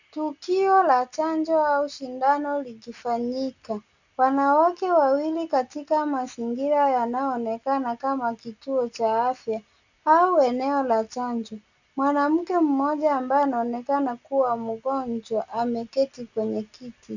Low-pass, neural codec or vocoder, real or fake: 7.2 kHz; none; real